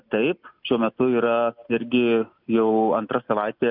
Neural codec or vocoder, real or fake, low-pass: none; real; 5.4 kHz